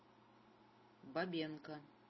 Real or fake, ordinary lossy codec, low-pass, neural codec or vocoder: real; MP3, 24 kbps; 7.2 kHz; none